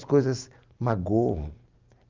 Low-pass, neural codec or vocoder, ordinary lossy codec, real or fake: 7.2 kHz; none; Opus, 24 kbps; real